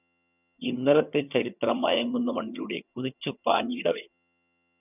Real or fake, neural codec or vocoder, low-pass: fake; vocoder, 22.05 kHz, 80 mel bands, HiFi-GAN; 3.6 kHz